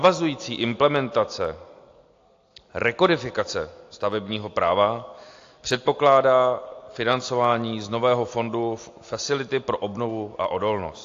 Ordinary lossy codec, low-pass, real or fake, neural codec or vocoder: AAC, 48 kbps; 7.2 kHz; real; none